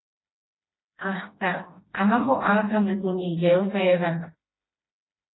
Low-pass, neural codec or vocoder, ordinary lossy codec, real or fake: 7.2 kHz; codec, 16 kHz, 1 kbps, FreqCodec, smaller model; AAC, 16 kbps; fake